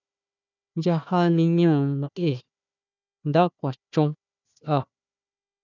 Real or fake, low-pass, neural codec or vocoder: fake; 7.2 kHz; codec, 16 kHz, 1 kbps, FunCodec, trained on Chinese and English, 50 frames a second